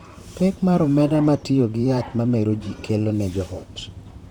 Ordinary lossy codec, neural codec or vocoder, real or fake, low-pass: none; vocoder, 44.1 kHz, 128 mel bands, Pupu-Vocoder; fake; 19.8 kHz